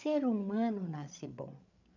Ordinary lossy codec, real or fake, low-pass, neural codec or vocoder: none; fake; 7.2 kHz; codec, 16 kHz, 8 kbps, FreqCodec, larger model